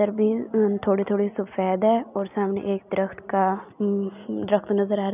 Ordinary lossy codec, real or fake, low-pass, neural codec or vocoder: none; real; 3.6 kHz; none